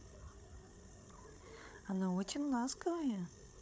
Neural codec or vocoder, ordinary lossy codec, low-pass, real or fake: codec, 16 kHz, 8 kbps, FreqCodec, larger model; none; none; fake